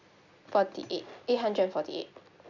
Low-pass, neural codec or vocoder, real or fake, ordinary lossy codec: 7.2 kHz; none; real; none